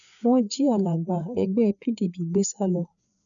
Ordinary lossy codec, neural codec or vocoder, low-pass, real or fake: none; codec, 16 kHz, 4 kbps, FreqCodec, larger model; 7.2 kHz; fake